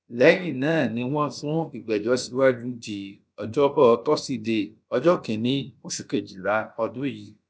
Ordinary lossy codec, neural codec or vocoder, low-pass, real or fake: none; codec, 16 kHz, about 1 kbps, DyCAST, with the encoder's durations; none; fake